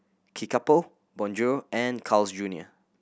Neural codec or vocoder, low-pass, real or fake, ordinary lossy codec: none; none; real; none